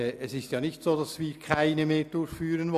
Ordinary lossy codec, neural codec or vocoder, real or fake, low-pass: none; none; real; 14.4 kHz